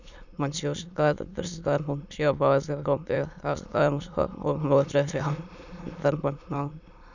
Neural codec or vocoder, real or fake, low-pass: autoencoder, 22.05 kHz, a latent of 192 numbers a frame, VITS, trained on many speakers; fake; 7.2 kHz